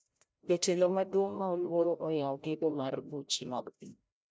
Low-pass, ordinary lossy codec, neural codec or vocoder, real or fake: none; none; codec, 16 kHz, 0.5 kbps, FreqCodec, larger model; fake